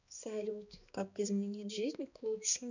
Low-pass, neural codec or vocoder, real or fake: 7.2 kHz; codec, 16 kHz, 2 kbps, X-Codec, HuBERT features, trained on balanced general audio; fake